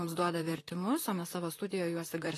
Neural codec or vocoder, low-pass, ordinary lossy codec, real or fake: vocoder, 44.1 kHz, 128 mel bands, Pupu-Vocoder; 14.4 kHz; AAC, 48 kbps; fake